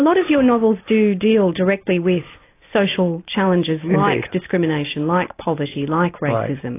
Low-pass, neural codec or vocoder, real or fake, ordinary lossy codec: 3.6 kHz; none; real; AAC, 24 kbps